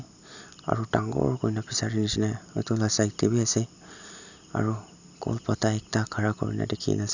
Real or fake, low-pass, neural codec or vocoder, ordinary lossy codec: real; 7.2 kHz; none; none